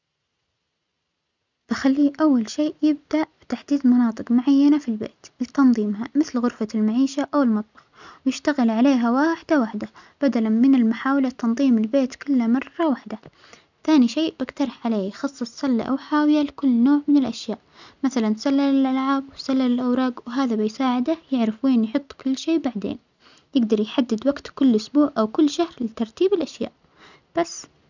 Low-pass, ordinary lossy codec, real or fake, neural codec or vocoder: 7.2 kHz; none; real; none